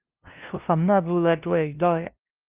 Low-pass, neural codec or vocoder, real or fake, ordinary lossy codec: 3.6 kHz; codec, 16 kHz, 0.5 kbps, FunCodec, trained on LibriTTS, 25 frames a second; fake; Opus, 32 kbps